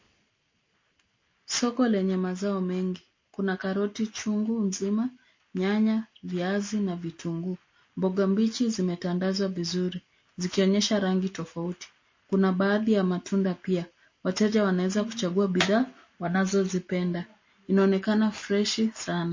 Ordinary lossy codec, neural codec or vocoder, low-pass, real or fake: MP3, 32 kbps; none; 7.2 kHz; real